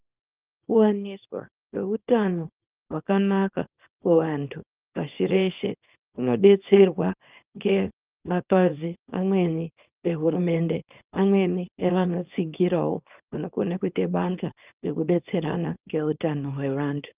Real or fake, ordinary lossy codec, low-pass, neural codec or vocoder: fake; Opus, 24 kbps; 3.6 kHz; codec, 24 kHz, 0.9 kbps, WavTokenizer, small release